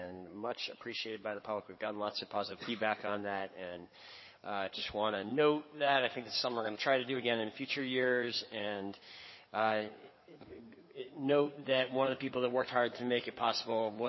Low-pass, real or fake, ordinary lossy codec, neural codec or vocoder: 7.2 kHz; fake; MP3, 24 kbps; codec, 16 kHz in and 24 kHz out, 2.2 kbps, FireRedTTS-2 codec